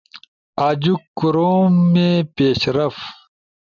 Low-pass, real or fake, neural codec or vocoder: 7.2 kHz; real; none